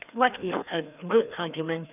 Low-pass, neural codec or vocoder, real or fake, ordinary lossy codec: 3.6 kHz; codec, 16 kHz, 2 kbps, FreqCodec, larger model; fake; none